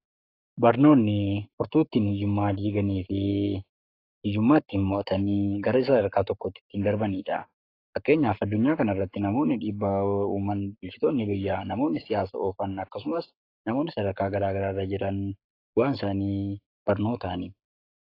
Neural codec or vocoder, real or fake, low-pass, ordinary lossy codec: codec, 44.1 kHz, 7.8 kbps, Pupu-Codec; fake; 5.4 kHz; AAC, 32 kbps